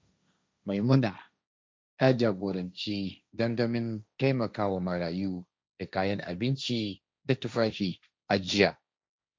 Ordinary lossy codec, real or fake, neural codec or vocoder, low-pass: none; fake; codec, 16 kHz, 1.1 kbps, Voila-Tokenizer; none